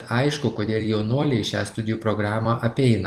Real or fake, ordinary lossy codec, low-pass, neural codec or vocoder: fake; Opus, 24 kbps; 14.4 kHz; vocoder, 44.1 kHz, 128 mel bands every 256 samples, BigVGAN v2